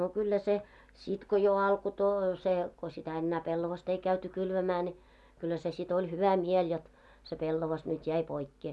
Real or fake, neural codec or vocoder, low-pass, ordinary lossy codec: real; none; none; none